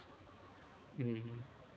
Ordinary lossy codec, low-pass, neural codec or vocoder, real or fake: none; none; codec, 16 kHz, 4 kbps, X-Codec, HuBERT features, trained on balanced general audio; fake